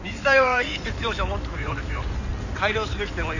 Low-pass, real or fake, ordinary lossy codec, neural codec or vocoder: 7.2 kHz; fake; none; codec, 16 kHz in and 24 kHz out, 2.2 kbps, FireRedTTS-2 codec